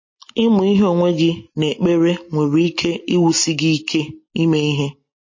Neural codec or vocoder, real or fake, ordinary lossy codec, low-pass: none; real; MP3, 32 kbps; 7.2 kHz